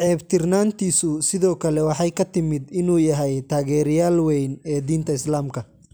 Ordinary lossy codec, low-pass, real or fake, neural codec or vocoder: none; none; real; none